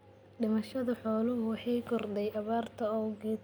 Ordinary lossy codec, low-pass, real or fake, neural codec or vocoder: none; none; real; none